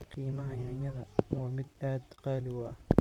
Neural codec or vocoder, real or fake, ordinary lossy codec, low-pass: vocoder, 44.1 kHz, 128 mel bands, Pupu-Vocoder; fake; none; 19.8 kHz